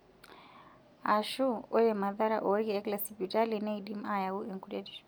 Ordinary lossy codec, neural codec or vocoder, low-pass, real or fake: none; none; none; real